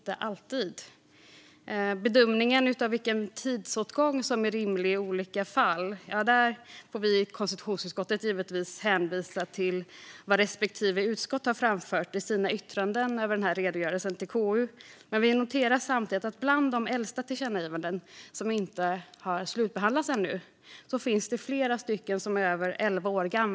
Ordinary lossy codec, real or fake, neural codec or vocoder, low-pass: none; real; none; none